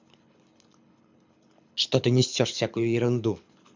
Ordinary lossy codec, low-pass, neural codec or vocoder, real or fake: MP3, 64 kbps; 7.2 kHz; codec, 24 kHz, 6 kbps, HILCodec; fake